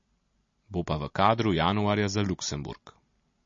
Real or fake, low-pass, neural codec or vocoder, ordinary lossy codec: real; 7.2 kHz; none; MP3, 32 kbps